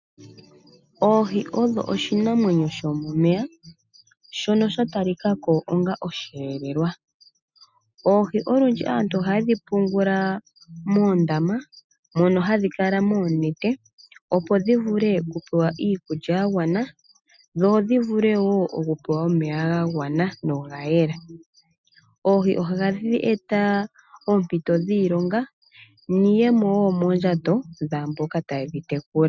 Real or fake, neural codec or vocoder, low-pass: real; none; 7.2 kHz